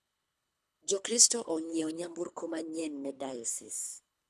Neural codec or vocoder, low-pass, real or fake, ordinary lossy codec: codec, 24 kHz, 3 kbps, HILCodec; none; fake; none